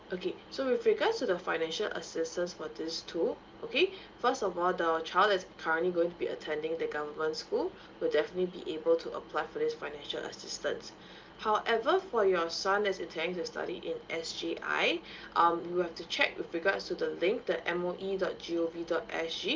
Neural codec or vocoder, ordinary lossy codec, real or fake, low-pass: none; Opus, 32 kbps; real; 7.2 kHz